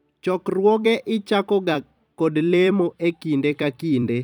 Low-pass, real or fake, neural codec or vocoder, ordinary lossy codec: 19.8 kHz; fake; vocoder, 44.1 kHz, 128 mel bands every 256 samples, BigVGAN v2; none